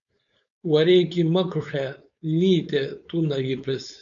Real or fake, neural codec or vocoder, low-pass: fake; codec, 16 kHz, 4.8 kbps, FACodec; 7.2 kHz